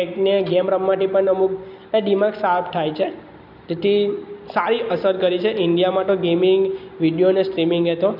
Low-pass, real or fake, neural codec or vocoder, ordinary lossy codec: 5.4 kHz; real; none; none